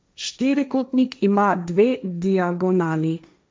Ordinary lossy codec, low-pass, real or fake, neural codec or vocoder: none; none; fake; codec, 16 kHz, 1.1 kbps, Voila-Tokenizer